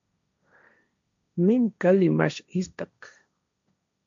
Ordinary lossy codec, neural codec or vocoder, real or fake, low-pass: MP3, 64 kbps; codec, 16 kHz, 1.1 kbps, Voila-Tokenizer; fake; 7.2 kHz